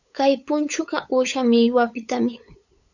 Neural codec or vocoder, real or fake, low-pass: codec, 16 kHz, 8 kbps, FunCodec, trained on LibriTTS, 25 frames a second; fake; 7.2 kHz